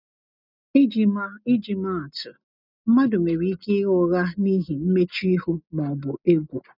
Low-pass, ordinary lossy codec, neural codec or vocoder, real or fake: 5.4 kHz; none; none; real